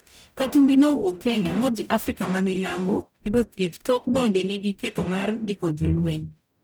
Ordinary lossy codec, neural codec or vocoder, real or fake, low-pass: none; codec, 44.1 kHz, 0.9 kbps, DAC; fake; none